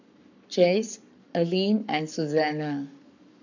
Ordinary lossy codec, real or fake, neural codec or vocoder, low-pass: none; fake; codec, 44.1 kHz, 7.8 kbps, Pupu-Codec; 7.2 kHz